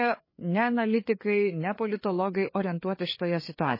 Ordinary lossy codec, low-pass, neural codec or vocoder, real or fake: MP3, 24 kbps; 5.4 kHz; codec, 16 kHz, 4 kbps, FreqCodec, larger model; fake